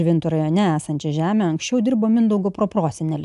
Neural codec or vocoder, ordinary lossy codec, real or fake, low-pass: none; AAC, 96 kbps; real; 10.8 kHz